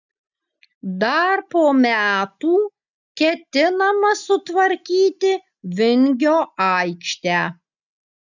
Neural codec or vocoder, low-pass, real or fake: none; 7.2 kHz; real